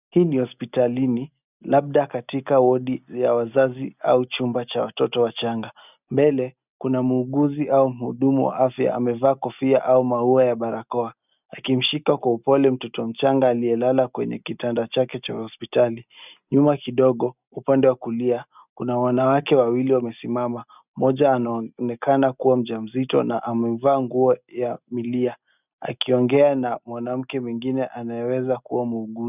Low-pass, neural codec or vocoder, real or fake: 3.6 kHz; none; real